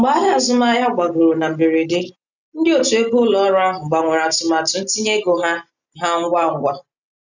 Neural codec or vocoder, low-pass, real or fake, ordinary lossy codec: none; 7.2 kHz; real; none